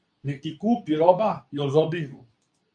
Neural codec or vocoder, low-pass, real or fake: codec, 24 kHz, 0.9 kbps, WavTokenizer, medium speech release version 2; 9.9 kHz; fake